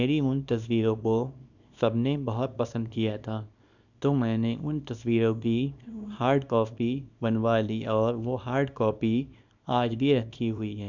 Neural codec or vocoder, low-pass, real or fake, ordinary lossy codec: codec, 24 kHz, 0.9 kbps, WavTokenizer, small release; 7.2 kHz; fake; none